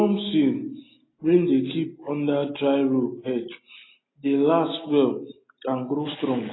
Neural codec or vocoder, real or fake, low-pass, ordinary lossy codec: none; real; 7.2 kHz; AAC, 16 kbps